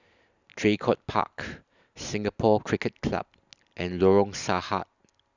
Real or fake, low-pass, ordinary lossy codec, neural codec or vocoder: real; 7.2 kHz; none; none